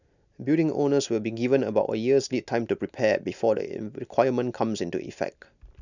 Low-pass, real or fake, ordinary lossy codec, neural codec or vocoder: 7.2 kHz; real; none; none